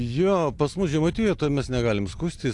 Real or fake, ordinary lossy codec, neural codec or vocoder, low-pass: real; AAC, 64 kbps; none; 10.8 kHz